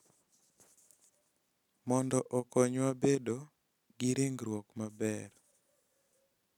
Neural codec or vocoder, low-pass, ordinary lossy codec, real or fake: none; 19.8 kHz; none; real